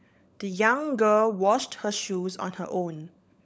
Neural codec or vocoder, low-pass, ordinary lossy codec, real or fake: codec, 16 kHz, 16 kbps, FunCodec, trained on LibriTTS, 50 frames a second; none; none; fake